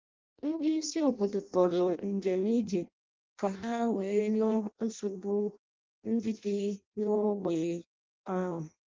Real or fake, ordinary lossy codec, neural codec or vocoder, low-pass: fake; Opus, 32 kbps; codec, 16 kHz in and 24 kHz out, 0.6 kbps, FireRedTTS-2 codec; 7.2 kHz